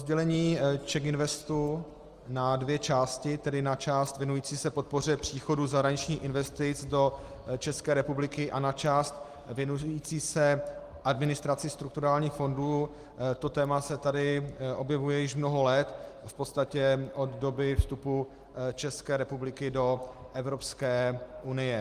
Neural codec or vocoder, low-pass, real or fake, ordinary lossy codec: none; 14.4 kHz; real; Opus, 24 kbps